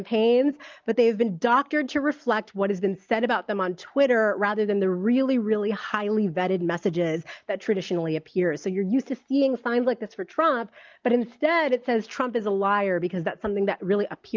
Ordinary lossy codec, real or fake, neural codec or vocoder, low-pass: Opus, 24 kbps; real; none; 7.2 kHz